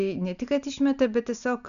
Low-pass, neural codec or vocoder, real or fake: 7.2 kHz; none; real